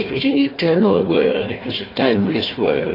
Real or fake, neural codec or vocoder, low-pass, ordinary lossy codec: fake; codec, 16 kHz, 1 kbps, FunCodec, trained on Chinese and English, 50 frames a second; 5.4 kHz; AAC, 32 kbps